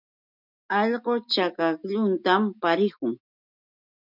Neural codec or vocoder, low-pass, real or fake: none; 5.4 kHz; real